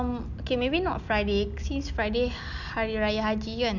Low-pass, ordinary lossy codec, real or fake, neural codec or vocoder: 7.2 kHz; none; real; none